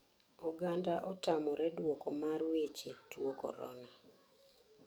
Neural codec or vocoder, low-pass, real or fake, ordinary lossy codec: codec, 44.1 kHz, 7.8 kbps, DAC; none; fake; none